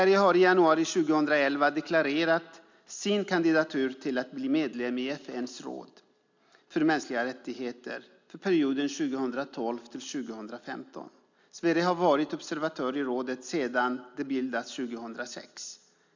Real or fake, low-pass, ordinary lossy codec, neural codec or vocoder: real; 7.2 kHz; MP3, 64 kbps; none